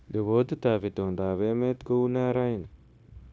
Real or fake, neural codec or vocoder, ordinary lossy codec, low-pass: fake; codec, 16 kHz, 0.9 kbps, LongCat-Audio-Codec; none; none